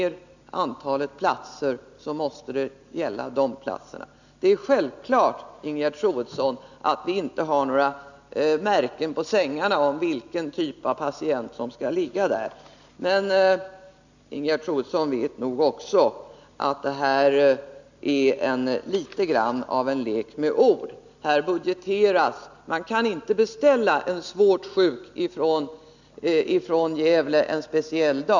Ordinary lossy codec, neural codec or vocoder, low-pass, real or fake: none; none; 7.2 kHz; real